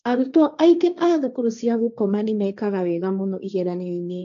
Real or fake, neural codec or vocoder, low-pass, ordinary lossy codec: fake; codec, 16 kHz, 1.1 kbps, Voila-Tokenizer; 7.2 kHz; none